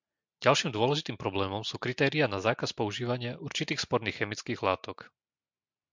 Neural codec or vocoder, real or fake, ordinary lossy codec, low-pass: none; real; MP3, 64 kbps; 7.2 kHz